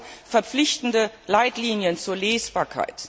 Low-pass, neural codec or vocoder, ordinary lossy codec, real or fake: none; none; none; real